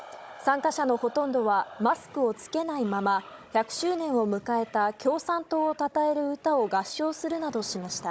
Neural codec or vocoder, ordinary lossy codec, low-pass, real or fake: codec, 16 kHz, 16 kbps, FunCodec, trained on Chinese and English, 50 frames a second; none; none; fake